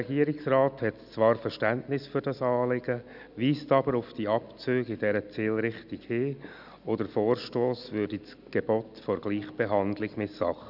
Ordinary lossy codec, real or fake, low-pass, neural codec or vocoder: none; real; 5.4 kHz; none